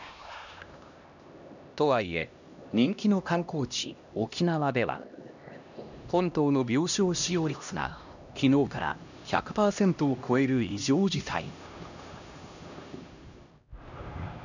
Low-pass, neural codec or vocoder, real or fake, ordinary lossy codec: 7.2 kHz; codec, 16 kHz, 1 kbps, X-Codec, HuBERT features, trained on LibriSpeech; fake; none